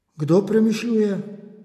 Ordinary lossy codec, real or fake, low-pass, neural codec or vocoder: none; real; 14.4 kHz; none